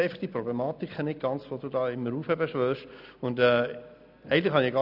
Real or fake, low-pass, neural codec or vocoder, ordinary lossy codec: real; 5.4 kHz; none; none